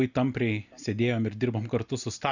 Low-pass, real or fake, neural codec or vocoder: 7.2 kHz; real; none